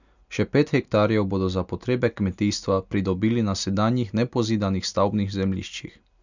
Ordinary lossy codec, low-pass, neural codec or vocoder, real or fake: none; 7.2 kHz; none; real